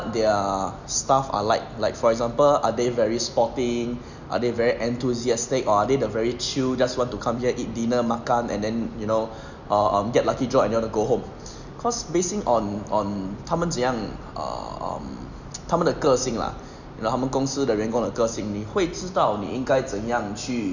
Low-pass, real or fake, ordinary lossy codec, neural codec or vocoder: 7.2 kHz; real; none; none